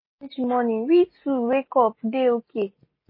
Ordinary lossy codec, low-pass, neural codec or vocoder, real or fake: MP3, 24 kbps; 5.4 kHz; none; real